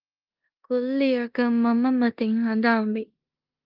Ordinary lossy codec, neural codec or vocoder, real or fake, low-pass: Opus, 24 kbps; codec, 16 kHz in and 24 kHz out, 0.9 kbps, LongCat-Audio-Codec, four codebook decoder; fake; 5.4 kHz